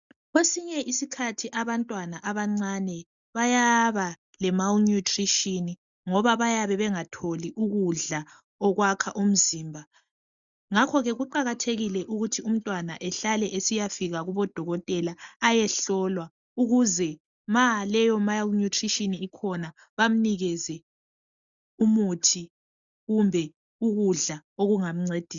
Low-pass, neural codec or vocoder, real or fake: 7.2 kHz; none; real